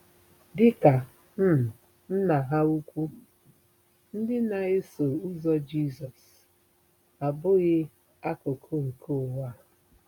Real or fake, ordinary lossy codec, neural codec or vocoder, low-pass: real; none; none; 19.8 kHz